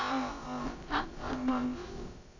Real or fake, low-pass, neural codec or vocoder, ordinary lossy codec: fake; 7.2 kHz; codec, 16 kHz, about 1 kbps, DyCAST, with the encoder's durations; AAC, 48 kbps